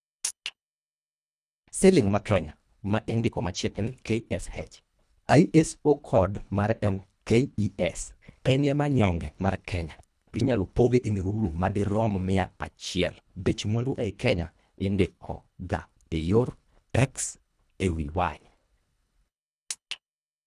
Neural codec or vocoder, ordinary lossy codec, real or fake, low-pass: codec, 24 kHz, 1.5 kbps, HILCodec; none; fake; none